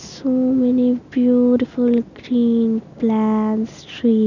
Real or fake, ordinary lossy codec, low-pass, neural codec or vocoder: real; none; 7.2 kHz; none